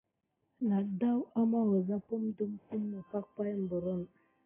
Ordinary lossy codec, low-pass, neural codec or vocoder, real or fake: AAC, 16 kbps; 3.6 kHz; none; real